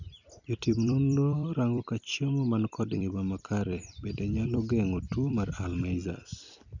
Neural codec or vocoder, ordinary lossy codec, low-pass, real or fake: vocoder, 44.1 kHz, 128 mel bands every 256 samples, BigVGAN v2; none; 7.2 kHz; fake